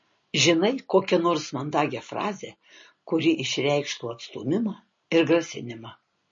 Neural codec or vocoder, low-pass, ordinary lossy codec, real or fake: none; 7.2 kHz; MP3, 32 kbps; real